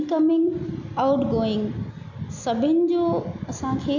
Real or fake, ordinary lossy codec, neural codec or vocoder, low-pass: real; none; none; 7.2 kHz